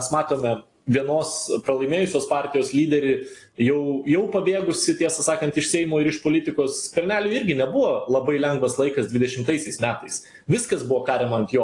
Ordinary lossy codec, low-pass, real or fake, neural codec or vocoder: AAC, 48 kbps; 10.8 kHz; real; none